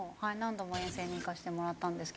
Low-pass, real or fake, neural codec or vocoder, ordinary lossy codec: none; real; none; none